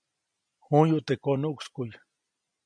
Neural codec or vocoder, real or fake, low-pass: none; real; 9.9 kHz